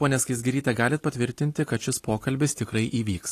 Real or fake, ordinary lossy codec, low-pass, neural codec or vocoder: real; AAC, 48 kbps; 14.4 kHz; none